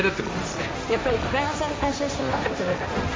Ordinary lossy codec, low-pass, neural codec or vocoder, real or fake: none; none; codec, 16 kHz, 1.1 kbps, Voila-Tokenizer; fake